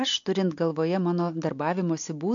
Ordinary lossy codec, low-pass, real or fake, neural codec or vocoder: MP3, 48 kbps; 7.2 kHz; real; none